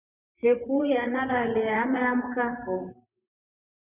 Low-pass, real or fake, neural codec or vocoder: 3.6 kHz; fake; vocoder, 44.1 kHz, 128 mel bands, Pupu-Vocoder